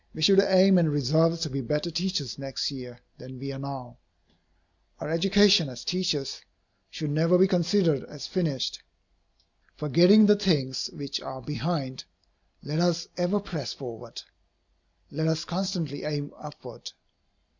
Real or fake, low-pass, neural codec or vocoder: real; 7.2 kHz; none